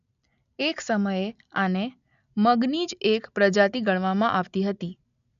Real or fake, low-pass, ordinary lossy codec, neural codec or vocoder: real; 7.2 kHz; none; none